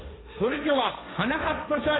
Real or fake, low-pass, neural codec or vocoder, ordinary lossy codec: fake; 7.2 kHz; codec, 16 kHz, 1.1 kbps, Voila-Tokenizer; AAC, 16 kbps